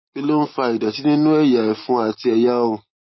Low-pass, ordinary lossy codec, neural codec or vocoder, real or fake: 7.2 kHz; MP3, 24 kbps; none; real